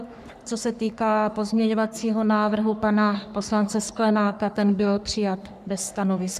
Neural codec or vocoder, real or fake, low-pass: codec, 44.1 kHz, 3.4 kbps, Pupu-Codec; fake; 14.4 kHz